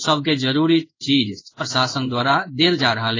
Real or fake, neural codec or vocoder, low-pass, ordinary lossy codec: fake; codec, 16 kHz in and 24 kHz out, 1 kbps, XY-Tokenizer; 7.2 kHz; AAC, 32 kbps